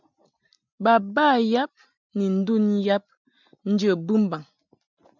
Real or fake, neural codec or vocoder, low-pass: real; none; 7.2 kHz